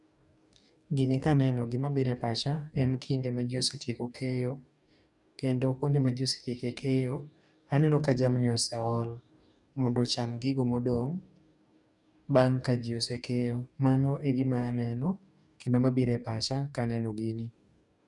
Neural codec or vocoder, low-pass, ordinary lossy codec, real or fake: codec, 44.1 kHz, 2.6 kbps, DAC; 10.8 kHz; none; fake